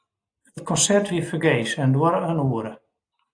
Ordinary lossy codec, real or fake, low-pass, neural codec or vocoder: AAC, 64 kbps; real; 9.9 kHz; none